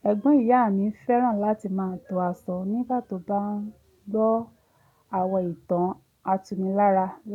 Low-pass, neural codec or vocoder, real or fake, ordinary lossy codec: 19.8 kHz; none; real; none